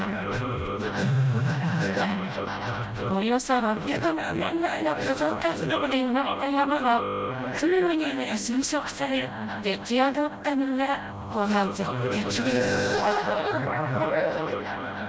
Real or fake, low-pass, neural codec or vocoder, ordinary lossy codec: fake; none; codec, 16 kHz, 0.5 kbps, FreqCodec, smaller model; none